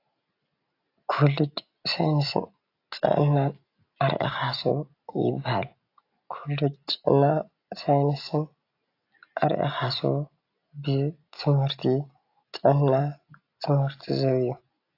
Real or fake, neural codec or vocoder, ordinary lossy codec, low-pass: real; none; AAC, 32 kbps; 5.4 kHz